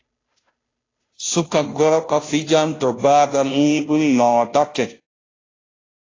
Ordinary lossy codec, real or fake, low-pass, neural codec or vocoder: AAC, 32 kbps; fake; 7.2 kHz; codec, 16 kHz, 0.5 kbps, FunCodec, trained on Chinese and English, 25 frames a second